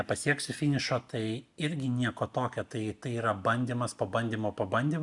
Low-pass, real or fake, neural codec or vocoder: 10.8 kHz; real; none